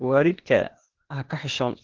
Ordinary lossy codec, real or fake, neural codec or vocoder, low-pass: Opus, 16 kbps; fake; codec, 16 kHz, 0.8 kbps, ZipCodec; 7.2 kHz